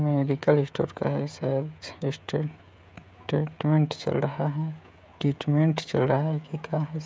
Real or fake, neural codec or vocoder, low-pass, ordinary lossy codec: fake; codec, 16 kHz, 16 kbps, FreqCodec, smaller model; none; none